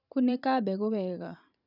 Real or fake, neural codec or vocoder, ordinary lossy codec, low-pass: fake; vocoder, 44.1 kHz, 128 mel bands every 512 samples, BigVGAN v2; none; 5.4 kHz